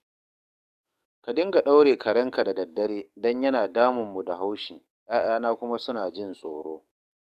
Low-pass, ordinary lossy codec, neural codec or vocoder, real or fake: 14.4 kHz; none; codec, 44.1 kHz, 7.8 kbps, DAC; fake